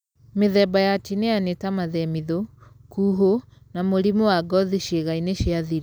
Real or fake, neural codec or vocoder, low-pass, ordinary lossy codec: real; none; none; none